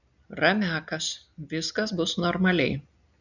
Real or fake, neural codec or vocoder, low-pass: real; none; 7.2 kHz